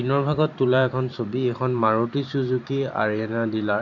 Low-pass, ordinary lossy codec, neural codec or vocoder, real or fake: 7.2 kHz; none; vocoder, 44.1 kHz, 128 mel bands every 512 samples, BigVGAN v2; fake